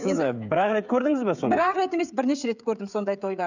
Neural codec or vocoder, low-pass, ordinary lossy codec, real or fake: codec, 16 kHz, 16 kbps, FreqCodec, smaller model; 7.2 kHz; none; fake